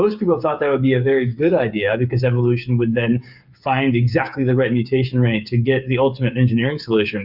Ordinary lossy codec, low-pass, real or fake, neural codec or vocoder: Opus, 64 kbps; 5.4 kHz; fake; codec, 16 kHz, 8 kbps, FreqCodec, smaller model